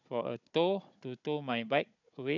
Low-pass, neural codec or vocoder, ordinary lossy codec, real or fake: 7.2 kHz; codec, 16 kHz, 16 kbps, FunCodec, trained on Chinese and English, 50 frames a second; none; fake